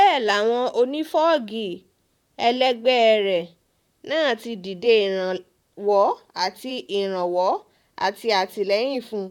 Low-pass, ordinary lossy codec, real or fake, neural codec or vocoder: 19.8 kHz; none; fake; vocoder, 44.1 kHz, 128 mel bands, Pupu-Vocoder